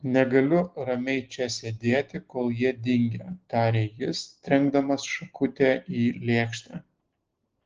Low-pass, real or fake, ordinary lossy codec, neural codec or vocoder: 7.2 kHz; real; Opus, 16 kbps; none